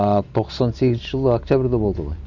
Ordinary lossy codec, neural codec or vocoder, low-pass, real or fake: none; none; 7.2 kHz; real